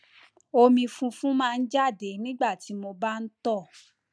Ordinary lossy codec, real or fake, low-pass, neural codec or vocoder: none; real; none; none